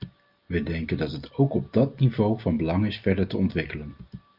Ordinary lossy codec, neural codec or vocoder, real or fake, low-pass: Opus, 24 kbps; none; real; 5.4 kHz